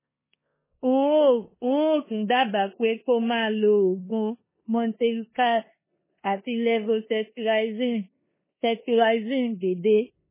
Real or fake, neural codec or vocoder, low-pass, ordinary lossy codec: fake; codec, 16 kHz in and 24 kHz out, 0.9 kbps, LongCat-Audio-Codec, four codebook decoder; 3.6 kHz; MP3, 16 kbps